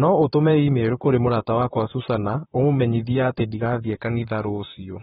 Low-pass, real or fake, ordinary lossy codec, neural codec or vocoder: 19.8 kHz; fake; AAC, 16 kbps; vocoder, 44.1 kHz, 128 mel bands, Pupu-Vocoder